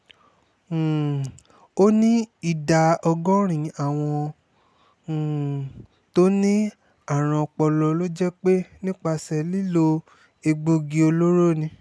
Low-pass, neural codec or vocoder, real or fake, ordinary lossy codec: none; none; real; none